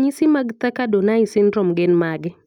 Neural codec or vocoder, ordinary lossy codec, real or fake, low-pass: none; none; real; 19.8 kHz